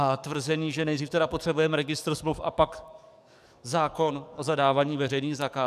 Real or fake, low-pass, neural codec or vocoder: fake; 14.4 kHz; codec, 44.1 kHz, 7.8 kbps, DAC